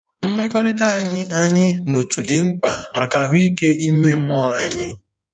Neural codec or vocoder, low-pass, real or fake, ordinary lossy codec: codec, 16 kHz in and 24 kHz out, 1.1 kbps, FireRedTTS-2 codec; 9.9 kHz; fake; none